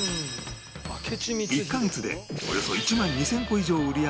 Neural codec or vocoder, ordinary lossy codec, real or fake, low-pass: none; none; real; none